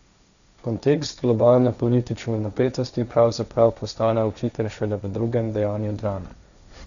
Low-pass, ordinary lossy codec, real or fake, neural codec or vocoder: 7.2 kHz; none; fake; codec, 16 kHz, 1.1 kbps, Voila-Tokenizer